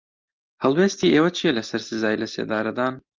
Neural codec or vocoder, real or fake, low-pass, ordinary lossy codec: none; real; 7.2 kHz; Opus, 24 kbps